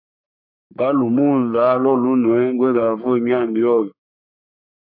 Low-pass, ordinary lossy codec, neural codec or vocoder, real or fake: 5.4 kHz; MP3, 48 kbps; codec, 44.1 kHz, 3.4 kbps, Pupu-Codec; fake